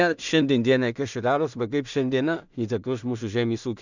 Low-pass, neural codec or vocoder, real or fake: 7.2 kHz; codec, 16 kHz in and 24 kHz out, 0.4 kbps, LongCat-Audio-Codec, two codebook decoder; fake